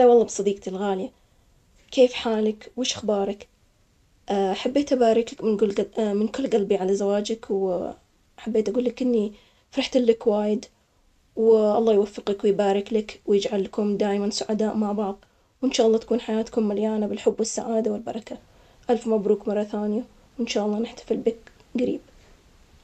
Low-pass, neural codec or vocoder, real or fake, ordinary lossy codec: 14.4 kHz; none; real; none